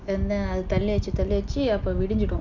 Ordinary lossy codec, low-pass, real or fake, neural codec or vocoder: none; 7.2 kHz; real; none